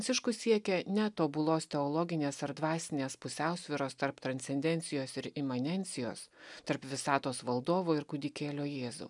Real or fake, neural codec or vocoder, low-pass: real; none; 10.8 kHz